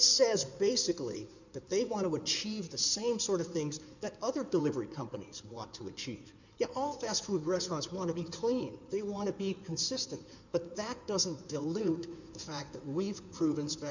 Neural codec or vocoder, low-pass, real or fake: codec, 16 kHz in and 24 kHz out, 2.2 kbps, FireRedTTS-2 codec; 7.2 kHz; fake